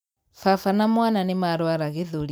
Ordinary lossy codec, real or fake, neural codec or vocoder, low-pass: none; real; none; none